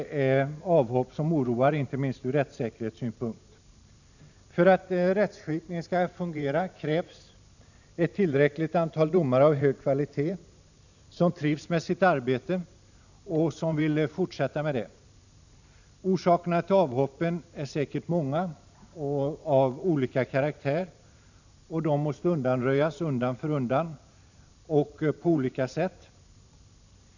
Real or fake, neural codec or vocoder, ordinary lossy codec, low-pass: fake; vocoder, 44.1 kHz, 128 mel bands every 512 samples, BigVGAN v2; none; 7.2 kHz